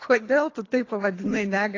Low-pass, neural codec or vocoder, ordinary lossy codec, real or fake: 7.2 kHz; codec, 24 kHz, 3 kbps, HILCodec; AAC, 32 kbps; fake